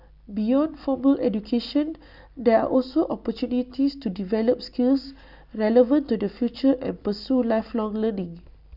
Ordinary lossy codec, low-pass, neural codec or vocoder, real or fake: none; 5.4 kHz; none; real